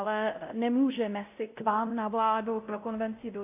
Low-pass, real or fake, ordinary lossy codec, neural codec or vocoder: 3.6 kHz; fake; AAC, 24 kbps; codec, 16 kHz, 0.5 kbps, X-Codec, WavLM features, trained on Multilingual LibriSpeech